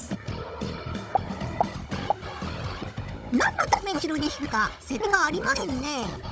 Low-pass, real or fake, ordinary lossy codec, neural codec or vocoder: none; fake; none; codec, 16 kHz, 16 kbps, FunCodec, trained on Chinese and English, 50 frames a second